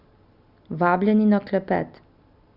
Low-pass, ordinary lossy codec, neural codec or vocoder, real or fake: 5.4 kHz; none; none; real